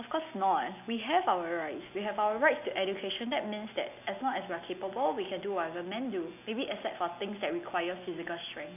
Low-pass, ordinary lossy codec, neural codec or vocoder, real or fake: 3.6 kHz; none; none; real